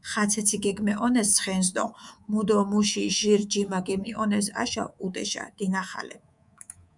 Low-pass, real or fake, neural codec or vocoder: 10.8 kHz; fake; codec, 24 kHz, 3.1 kbps, DualCodec